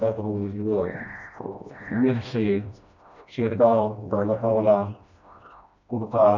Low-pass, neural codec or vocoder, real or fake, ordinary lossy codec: 7.2 kHz; codec, 16 kHz, 1 kbps, FreqCodec, smaller model; fake; none